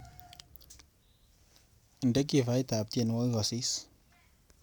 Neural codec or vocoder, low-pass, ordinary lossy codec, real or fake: none; none; none; real